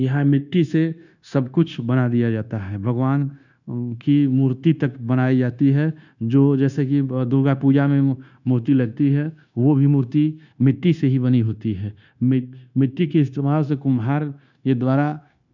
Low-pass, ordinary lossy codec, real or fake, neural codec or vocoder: 7.2 kHz; none; fake; codec, 16 kHz, 0.9 kbps, LongCat-Audio-Codec